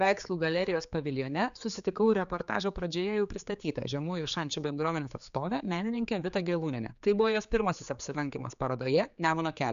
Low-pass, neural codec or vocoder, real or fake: 7.2 kHz; codec, 16 kHz, 4 kbps, X-Codec, HuBERT features, trained on general audio; fake